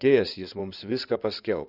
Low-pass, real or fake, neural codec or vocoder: 5.4 kHz; fake; codec, 16 kHz in and 24 kHz out, 2.2 kbps, FireRedTTS-2 codec